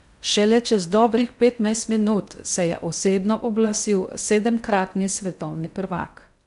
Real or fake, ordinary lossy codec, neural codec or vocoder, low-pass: fake; none; codec, 16 kHz in and 24 kHz out, 0.6 kbps, FocalCodec, streaming, 4096 codes; 10.8 kHz